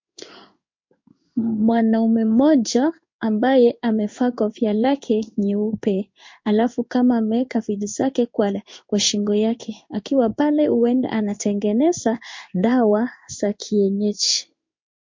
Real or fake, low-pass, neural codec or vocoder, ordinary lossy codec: fake; 7.2 kHz; codec, 16 kHz in and 24 kHz out, 1 kbps, XY-Tokenizer; MP3, 48 kbps